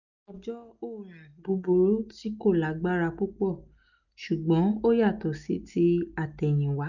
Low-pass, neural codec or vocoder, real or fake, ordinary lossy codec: 7.2 kHz; none; real; none